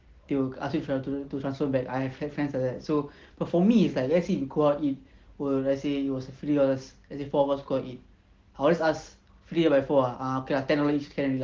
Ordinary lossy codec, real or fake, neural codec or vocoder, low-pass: Opus, 16 kbps; real; none; 7.2 kHz